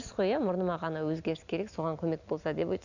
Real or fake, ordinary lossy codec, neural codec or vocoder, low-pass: real; none; none; 7.2 kHz